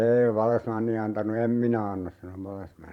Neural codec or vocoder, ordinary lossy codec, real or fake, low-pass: vocoder, 44.1 kHz, 128 mel bands every 512 samples, BigVGAN v2; none; fake; 19.8 kHz